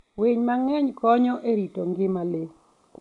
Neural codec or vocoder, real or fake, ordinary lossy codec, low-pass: none; real; none; 10.8 kHz